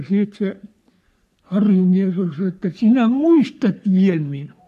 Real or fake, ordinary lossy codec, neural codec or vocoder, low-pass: fake; none; codec, 44.1 kHz, 3.4 kbps, Pupu-Codec; 14.4 kHz